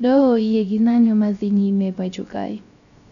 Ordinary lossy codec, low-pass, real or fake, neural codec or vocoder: none; 7.2 kHz; fake; codec, 16 kHz, 0.3 kbps, FocalCodec